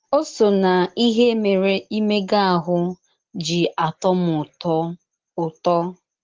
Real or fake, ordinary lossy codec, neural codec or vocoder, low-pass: real; Opus, 16 kbps; none; 7.2 kHz